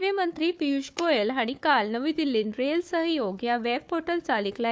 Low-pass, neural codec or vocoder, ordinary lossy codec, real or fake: none; codec, 16 kHz, 4 kbps, FunCodec, trained on Chinese and English, 50 frames a second; none; fake